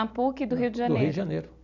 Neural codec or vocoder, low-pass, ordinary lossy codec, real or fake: none; 7.2 kHz; none; real